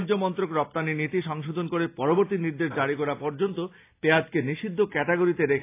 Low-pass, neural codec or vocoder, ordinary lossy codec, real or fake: 3.6 kHz; none; AAC, 24 kbps; real